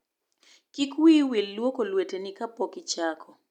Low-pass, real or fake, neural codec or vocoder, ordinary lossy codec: 19.8 kHz; real; none; none